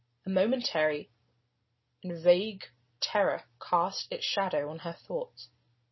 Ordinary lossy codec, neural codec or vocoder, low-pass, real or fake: MP3, 24 kbps; none; 7.2 kHz; real